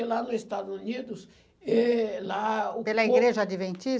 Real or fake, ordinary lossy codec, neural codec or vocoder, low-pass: real; none; none; none